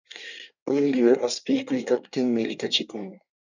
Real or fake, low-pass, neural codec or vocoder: fake; 7.2 kHz; codec, 24 kHz, 1 kbps, SNAC